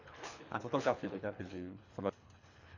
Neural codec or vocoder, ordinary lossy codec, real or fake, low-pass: codec, 24 kHz, 3 kbps, HILCodec; none; fake; 7.2 kHz